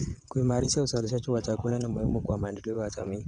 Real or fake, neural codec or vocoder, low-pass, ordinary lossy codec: fake; vocoder, 22.05 kHz, 80 mel bands, WaveNeXt; 9.9 kHz; none